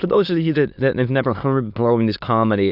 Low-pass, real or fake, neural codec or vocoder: 5.4 kHz; fake; autoencoder, 22.05 kHz, a latent of 192 numbers a frame, VITS, trained on many speakers